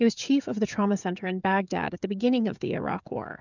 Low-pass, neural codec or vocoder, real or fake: 7.2 kHz; codec, 16 kHz, 16 kbps, FreqCodec, smaller model; fake